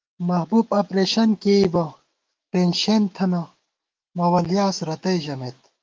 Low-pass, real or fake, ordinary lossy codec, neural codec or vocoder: 7.2 kHz; fake; Opus, 24 kbps; autoencoder, 48 kHz, 128 numbers a frame, DAC-VAE, trained on Japanese speech